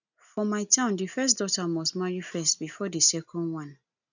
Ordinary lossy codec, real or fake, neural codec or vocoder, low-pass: none; real; none; 7.2 kHz